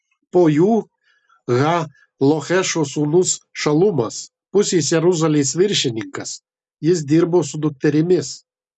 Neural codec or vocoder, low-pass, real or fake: none; 10.8 kHz; real